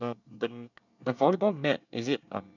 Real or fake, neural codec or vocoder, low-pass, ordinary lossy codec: fake; codec, 24 kHz, 1 kbps, SNAC; 7.2 kHz; none